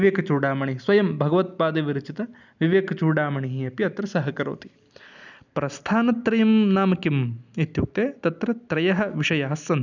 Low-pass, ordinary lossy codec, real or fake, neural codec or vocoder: 7.2 kHz; none; real; none